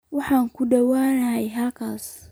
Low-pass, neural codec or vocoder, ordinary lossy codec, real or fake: none; vocoder, 44.1 kHz, 128 mel bands every 256 samples, BigVGAN v2; none; fake